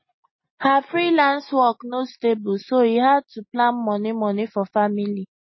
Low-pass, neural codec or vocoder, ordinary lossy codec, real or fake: 7.2 kHz; none; MP3, 24 kbps; real